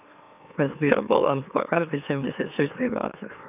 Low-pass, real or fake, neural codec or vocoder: 3.6 kHz; fake; autoencoder, 44.1 kHz, a latent of 192 numbers a frame, MeloTTS